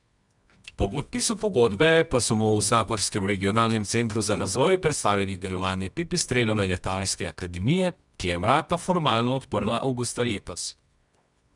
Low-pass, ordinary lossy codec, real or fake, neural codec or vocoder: 10.8 kHz; none; fake; codec, 24 kHz, 0.9 kbps, WavTokenizer, medium music audio release